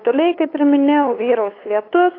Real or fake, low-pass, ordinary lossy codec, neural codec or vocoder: fake; 5.4 kHz; AAC, 24 kbps; codec, 16 kHz, 8 kbps, FunCodec, trained on LibriTTS, 25 frames a second